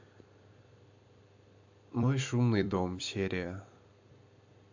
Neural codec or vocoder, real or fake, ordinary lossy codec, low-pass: vocoder, 44.1 kHz, 128 mel bands every 256 samples, BigVGAN v2; fake; AAC, 48 kbps; 7.2 kHz